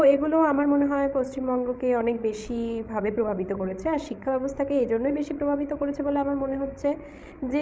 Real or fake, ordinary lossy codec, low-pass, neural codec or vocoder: fake; none; none; codec, 16 kHz, 16 kbps, FreqCodec, larger model